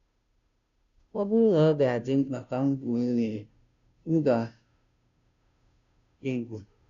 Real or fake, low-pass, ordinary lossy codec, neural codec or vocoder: fake; 7.2 kHz; none; codec, 16 kHz, 0.5 kbps, FunCodec, trained on Chinese and English, 25 frames a second